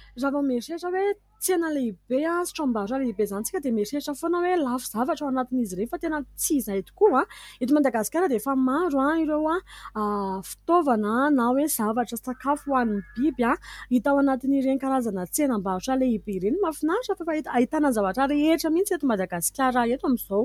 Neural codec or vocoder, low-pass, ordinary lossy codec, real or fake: none; 19.8 kHz; MP3, 96 kbps; real